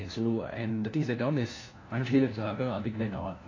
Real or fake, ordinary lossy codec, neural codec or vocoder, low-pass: fake; AAC, 32 kbps; codec, 16 kHz, 1 kbps, FunCodec, trained on LibriTTS, 50 frames a second; 7.2 kHz